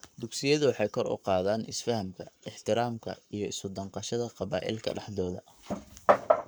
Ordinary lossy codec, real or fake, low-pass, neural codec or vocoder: none; fake; none; codec, 44.1 kHz, 7.8 kbps, Pupu-Codec